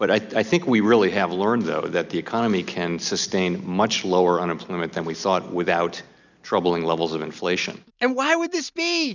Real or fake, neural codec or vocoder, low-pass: real; none; 7.2 kHz